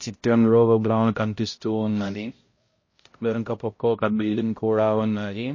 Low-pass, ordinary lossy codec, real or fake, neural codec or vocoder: 7.2 kHz; MP3, 32 kbps; fake; codec, 16 kHz, 0.5 kbps, X-Codec, HuBERT features, trained on balanced general audio